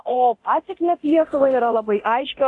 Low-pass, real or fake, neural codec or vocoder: 10.8 kHz; fake; codec, 24 kHz, 0.9 kbps, DualCodec